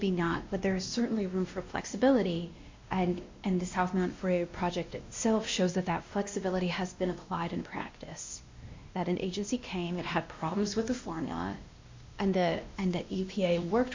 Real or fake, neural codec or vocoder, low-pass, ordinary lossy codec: fake; codec, 16 kHz, 1 kbps, X-Codec, WavLM features, trained on Multilingual LibriSpeech; 7.2 kHz; MP3, 48 kbps